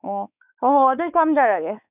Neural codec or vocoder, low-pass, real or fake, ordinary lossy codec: codec, 16 kHz, 4 kbps, X-Codec, WavLM features, trained on Multilingual LibriSpeech; 3.6 kHz; fake; none